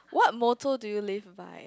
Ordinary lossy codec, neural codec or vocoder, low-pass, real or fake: none; none; none; real